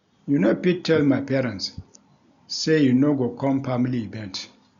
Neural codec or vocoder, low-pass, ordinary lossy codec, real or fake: none; 7.2 kHz; none; real